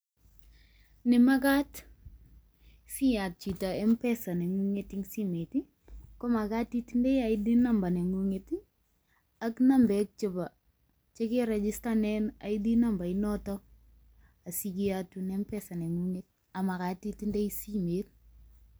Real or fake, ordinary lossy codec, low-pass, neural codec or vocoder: real; none; none; none